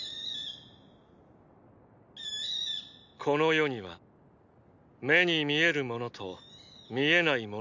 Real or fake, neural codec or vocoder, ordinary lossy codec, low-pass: real; none; none; 7.2 kHz